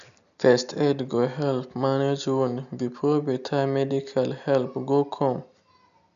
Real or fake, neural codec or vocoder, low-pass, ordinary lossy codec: real; none; 7.2 kHz; none